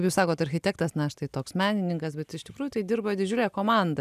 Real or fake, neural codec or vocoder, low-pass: real; none; 14.4 kHz